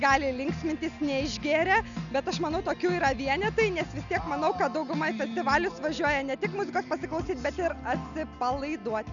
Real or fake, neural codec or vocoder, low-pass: real; none; 7.2 kHz